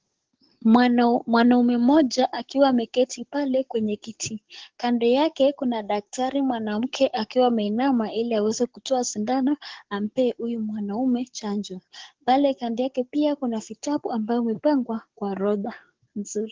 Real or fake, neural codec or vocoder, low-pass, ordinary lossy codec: fake; codec, 44.1 kHz, 7.8 kbps, DAC; 7.2 kHz; Opus, 16 kbps